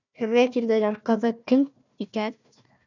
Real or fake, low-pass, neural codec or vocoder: fake; 7.2 kHz; codec, 16 kHz, 1 kbps, FunCodec, trained on Chinese and English, 50 frames a second